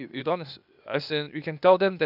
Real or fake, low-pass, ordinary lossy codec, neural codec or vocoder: fake; 5.4 kHz; none; codec, 16 kHz, 0.8 kbps, ZipCodec